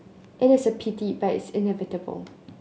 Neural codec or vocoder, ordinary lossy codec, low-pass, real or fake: none; none; none; real